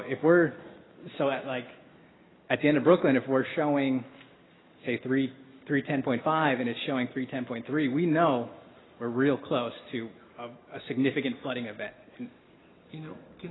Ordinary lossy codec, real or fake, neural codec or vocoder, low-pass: AAC, 16 kbps; real; none; 7.2 kHz